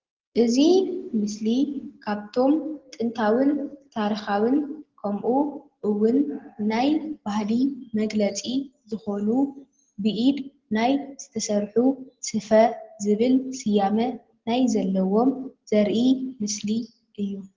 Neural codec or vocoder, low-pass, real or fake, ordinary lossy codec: none; 7.2 kHz; real; Opus, 16 kbps